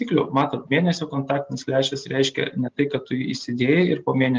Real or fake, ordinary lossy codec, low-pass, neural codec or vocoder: real; Opus, 24 kbps; 7.2 kHz; none